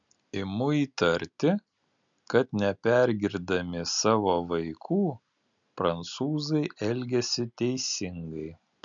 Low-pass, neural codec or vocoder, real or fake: 7.2 kHz; none; real